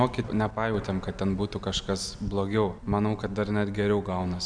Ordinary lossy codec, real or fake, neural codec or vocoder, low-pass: MP3, 96 kbps; real; none; 9.9 kHz